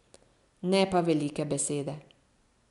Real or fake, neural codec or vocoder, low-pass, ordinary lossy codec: real; none; 10.8 kHz; none